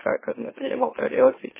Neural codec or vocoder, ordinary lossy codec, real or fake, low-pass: autoencoder, 44.1 kHz, a latent of 192 numbers a frame, MeloTTS; MP3, 16 kbps; fake; 3.6 kHz